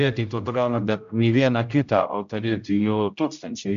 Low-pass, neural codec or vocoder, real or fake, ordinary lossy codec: 7.2 kHz; codec, 16 kHz, 0.5 kbps, X-Codec, HuBERT features, trained on general audio; fake; AAC, 96 kbps